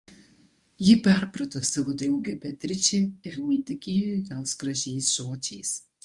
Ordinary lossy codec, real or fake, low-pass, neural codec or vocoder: Opus, 64 kbps; fake; 10.8 kHz; codec, 24 kHz, 0.9 kbps, WavTokenizer, medium speech release version 1